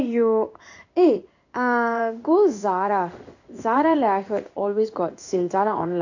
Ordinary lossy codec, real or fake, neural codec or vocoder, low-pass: none; fake; codec, 16 kHz in and 24 kHz out, 1 kbps, XY-Tokenizer; 7.2 kHz